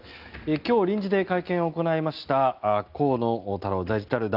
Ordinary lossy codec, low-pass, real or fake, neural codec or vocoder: Opus, 24 kbps; 5.4 kHz; real; none